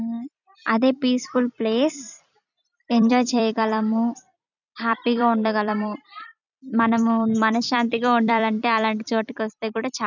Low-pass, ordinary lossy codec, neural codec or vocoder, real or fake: 7.2 kHz; none; vocoder, 44.1 kHz, 128 mel bands every 256 samples, BigVGAN v2; fake